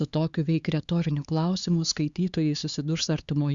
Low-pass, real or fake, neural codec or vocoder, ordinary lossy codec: 7.2 kHz; fake; codec, 16 kHz, 4 kbps, X-Codec, HuBERT features, trained on LibriSpeech; Opus, 64 kbps